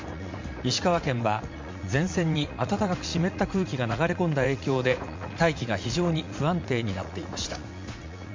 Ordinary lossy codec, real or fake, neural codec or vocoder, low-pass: MP3, 48 kbps; fake; vocoder, 44.1 kHz, 80 mel bands, Vocos; 7.2 kHz